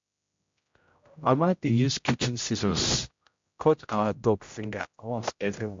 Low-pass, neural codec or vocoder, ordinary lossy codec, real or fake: 7.2 kHz; codec, 16 kHz, 0.5 kbps, X-Codec, HuBERT features, trained on general audio; MP3, 48 kbps; fake